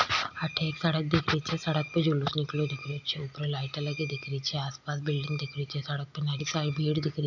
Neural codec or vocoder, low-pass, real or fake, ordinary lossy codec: none; 7.2 kHz; real; none